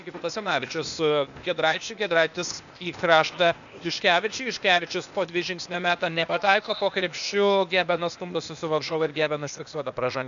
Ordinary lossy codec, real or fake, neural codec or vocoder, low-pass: MP3, 96 kbps; fake; codec, 16 kHz, 0.8 kbps, ZipCodec; 7.2 kHz